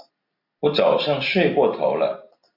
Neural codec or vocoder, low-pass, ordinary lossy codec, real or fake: none; 5.4 kHz; AAC, 32 kbps; real